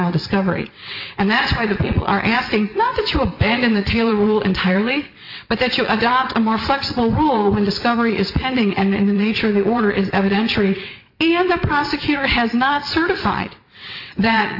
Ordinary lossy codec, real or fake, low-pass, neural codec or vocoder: AAC, 48 kbps; fake; 5.4 kHz; vocoder, 22.05 kHz, 80 mel bands, WaveNeXt